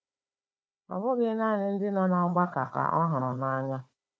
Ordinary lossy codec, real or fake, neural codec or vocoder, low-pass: none; fake; codec, 16 kHz, 4 kbps, FunCodec, trained on Chinese and English, 50 frames a second; none